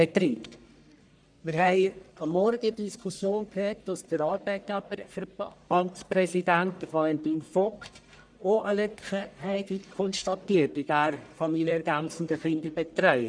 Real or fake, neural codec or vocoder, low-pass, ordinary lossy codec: fake; codec, 44.1 kHz, 1.7 kbps, Pupu-Codec; 9.9 kHz; none